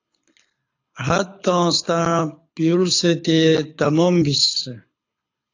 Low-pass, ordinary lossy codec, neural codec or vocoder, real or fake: 7.2 kHz; AAC, 48 kbps; codec, 24 kHz, 6 kbps, HILCodec; fake